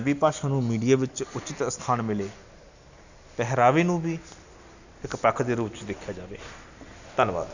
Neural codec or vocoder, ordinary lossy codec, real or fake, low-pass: none; none; real; 7.2 kHz